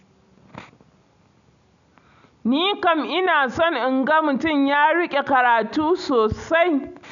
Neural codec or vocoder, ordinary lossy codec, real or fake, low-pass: none; none; real; 7.2 kHz